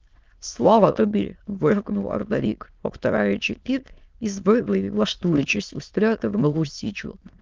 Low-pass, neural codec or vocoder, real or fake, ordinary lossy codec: 7.2 kHz; autoencoder, 22.05 kHz, a latent of 192 numbers a frame, VITS, trained on many speakers; fake; Opus, 32 kbps